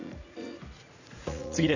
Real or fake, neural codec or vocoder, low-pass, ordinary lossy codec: fake; vocoder, 44.1 kHz, 80 mel bands, Vocos; 7.2 kHz; none